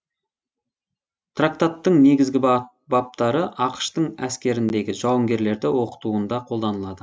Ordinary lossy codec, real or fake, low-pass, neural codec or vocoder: none; real; none; none